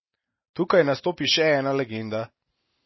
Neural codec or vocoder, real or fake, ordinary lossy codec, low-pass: none; real; MP3, 24 kbps; 7.2 kHz